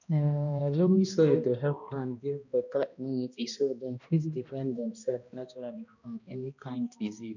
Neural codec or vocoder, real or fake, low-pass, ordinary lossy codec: codec, 16 kHz, 1 kbps, X-Codec, HuBERT features, trained on balanced general audio; fake; 7.2 kHz; none